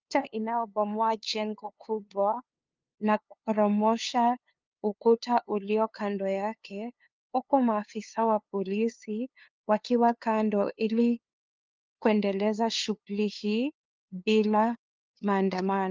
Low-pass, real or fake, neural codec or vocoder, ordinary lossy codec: 7.2 kHz; fake; codec, 16 kHz, 2 kbps, FunCodec, trained on LibriTTS, 25 frames a second; Opus, 24 kbps